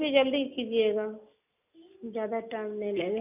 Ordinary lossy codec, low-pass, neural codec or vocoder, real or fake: none; 3.6 kHz; none; real